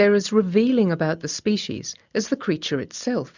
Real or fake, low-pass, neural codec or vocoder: real; 7.2 kHz; none